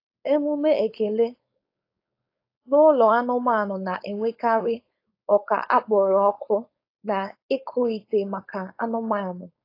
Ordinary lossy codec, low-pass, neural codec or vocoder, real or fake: AAC, 32 kbps; 5.4 kHz; codec, 16 kHz, 4.8 kbps, FACodec; fake